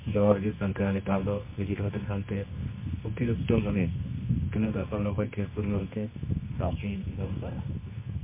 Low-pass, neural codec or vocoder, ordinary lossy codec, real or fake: 3.6 kHz; codec, 24 kHz, 0.9 kbps, WavTokenizer, medium music audio release; MP3, 24 kbps; fake